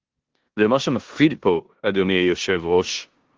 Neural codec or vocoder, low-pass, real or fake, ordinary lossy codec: codec, 16 kHz in and 24 kHz out, 0.9 kbps, LongCat-Audio-Codec, four codebook decoder; 7.2 kHz; fake; Opus, 16 kbps